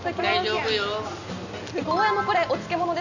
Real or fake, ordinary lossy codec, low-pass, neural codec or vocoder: real; none; 7.2 kHz; none